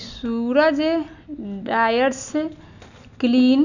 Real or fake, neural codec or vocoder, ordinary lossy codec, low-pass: real; none; none; 7.2 kHz